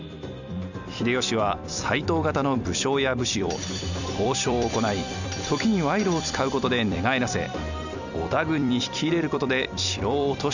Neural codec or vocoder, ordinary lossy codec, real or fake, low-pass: vocoder, 44.1 kHz, 128 mel bands every 256 samples, BigVGAN v2; none; fake; 7.2 kHz